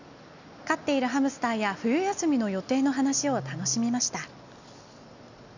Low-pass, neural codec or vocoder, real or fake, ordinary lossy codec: 7.2 kHz; none; real; none